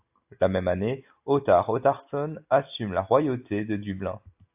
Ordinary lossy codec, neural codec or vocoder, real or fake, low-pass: AAC, 32 kbps; none; real; 3.6 kHz